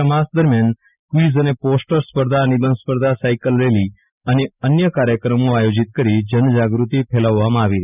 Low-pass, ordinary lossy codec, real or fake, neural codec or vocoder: 3.6 kHz; none; real; none